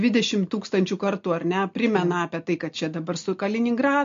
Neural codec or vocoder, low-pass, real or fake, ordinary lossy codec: none; 7.2 kHz; real; MP3, 48 kbps